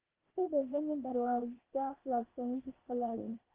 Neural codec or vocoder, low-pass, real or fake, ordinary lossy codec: codec, 16 kHz, 0.8 kbps, ZipCodec; 3.6 kHz; fake; Opus, 16 kbps